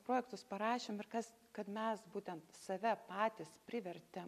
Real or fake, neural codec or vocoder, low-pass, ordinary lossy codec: real; none; 14.4 kHz; AAC, 96 kbps